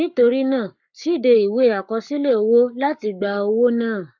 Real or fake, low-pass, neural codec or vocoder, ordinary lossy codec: fake; 7.2 kHz; codec, 44.1 kHz, 7.8 kbps, Pupu-Codec; none